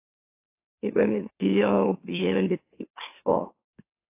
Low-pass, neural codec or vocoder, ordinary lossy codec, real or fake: 3.6 kHz; autoencoder, 44.1 kHz, a latent of 192 numbers a frame, MeloTTS; AAC, 24 kbps; fake